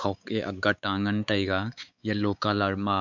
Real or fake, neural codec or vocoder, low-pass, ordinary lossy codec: fake; codec, 16 kHz, 4 kbps, X-Codec, WavLM features, trained on Multilingual LibriSpeech; 7.2 kHz; none